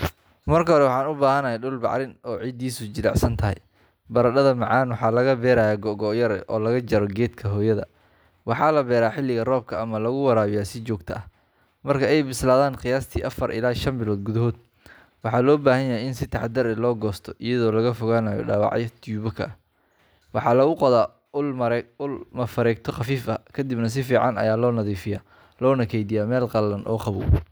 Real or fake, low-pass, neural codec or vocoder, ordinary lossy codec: real; none; none; none